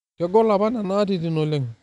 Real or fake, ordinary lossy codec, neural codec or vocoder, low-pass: real; none; none; 10.8 kHz